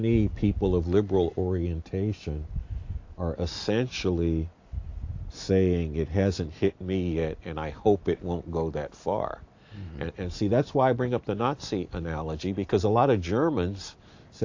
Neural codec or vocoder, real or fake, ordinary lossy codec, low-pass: none; real; AAC, 48 kbps; 7.2 kHz